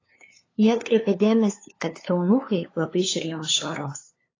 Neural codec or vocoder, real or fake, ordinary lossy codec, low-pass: codec, 16 kHz, 2 kbps, FunCodec, trained on LibriTTS, 25 frames a second; fake; AAC, 32 kbps; 7.2 kHz